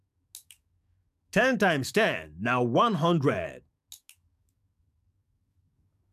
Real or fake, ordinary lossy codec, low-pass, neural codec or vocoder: fake; MP3, 96 kbps; 14.4 kHz; codec, 44.1 kHz, 7.8 kbps, DAC